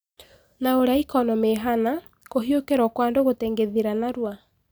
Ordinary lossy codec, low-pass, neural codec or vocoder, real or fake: none; none; none; real